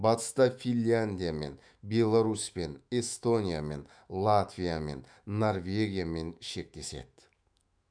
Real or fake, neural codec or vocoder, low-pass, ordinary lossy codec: fake; autoencoder, 48 kHz, 128 numbers a frame, DAC-VAE, trained on Japanese speech; 9.9 kHz; none